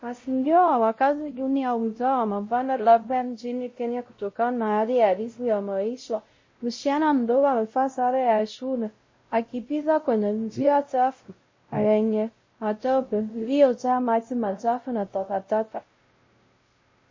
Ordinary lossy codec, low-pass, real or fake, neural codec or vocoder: MP3, 32 kbps; 7.2 kHz; fake; codec, 16 kHz, 0.5 kbps, X-Codec, WavLM features, trained on Multilingual LibriSpeech